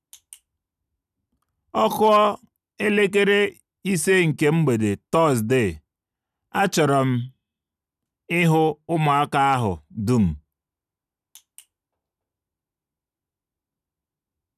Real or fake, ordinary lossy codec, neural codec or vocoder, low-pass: real; none; none; 14.4 kHz